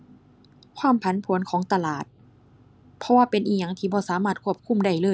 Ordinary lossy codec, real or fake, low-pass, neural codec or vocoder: none; real; none; none